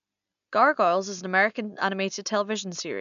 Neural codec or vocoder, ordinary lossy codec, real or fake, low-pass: none; none; real; 7.2 kHz